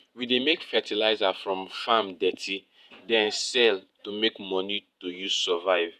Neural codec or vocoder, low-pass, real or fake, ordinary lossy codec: vocoder, 48 kHz, 128 mel bands, Vocos; 14.4 kHz; fake; none